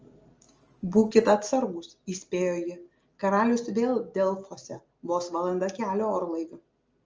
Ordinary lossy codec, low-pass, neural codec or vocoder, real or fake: Opus, 24 kbps; 7.2 kHz; none; real